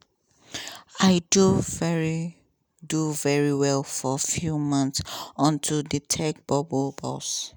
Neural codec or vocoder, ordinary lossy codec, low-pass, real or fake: none; none; none; real